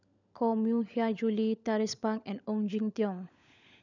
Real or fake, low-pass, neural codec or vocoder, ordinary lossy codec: fake; 7.2 kHz; codec, 16 kHz, 16 kbps, FunCodec, trained on LibriTTS, 50 frames a second; none